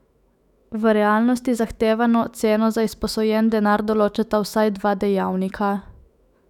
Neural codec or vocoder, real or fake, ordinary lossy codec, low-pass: autoencoder, 48 kHz, 128 numbers a frame, DAC-VAE, trained on Japanese speech; fake; none; 19.8 kHz